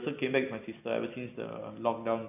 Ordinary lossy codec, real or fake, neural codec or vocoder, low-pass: none; real; none; 3.6 kHz